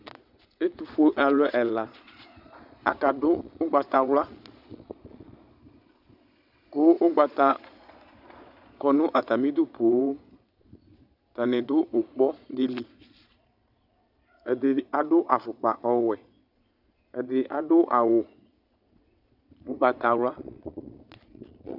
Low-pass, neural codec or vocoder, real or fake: 5.4 kHz; vocoder, 22.05 kHz, 80 mel bands, Vocos; fake